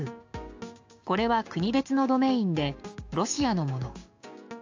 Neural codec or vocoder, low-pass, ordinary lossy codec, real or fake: codec, 16 kHz, 6 kbps, DAC; 7.2 kHz; AAC, 48 kbps; fake